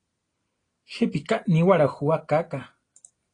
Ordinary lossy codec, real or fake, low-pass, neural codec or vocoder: AAC, 32 kbps; real; 9.9 kHz; none